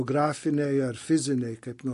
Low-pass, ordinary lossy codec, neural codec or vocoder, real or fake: 14.4 kHz; MP3, 48 kbps; none; real